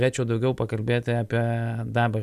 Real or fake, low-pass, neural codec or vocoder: real; 14.4 kHz; none